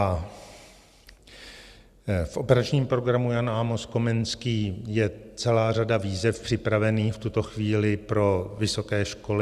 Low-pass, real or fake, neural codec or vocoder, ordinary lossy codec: 14.4 kHz; real; none; Opus, 64 kbps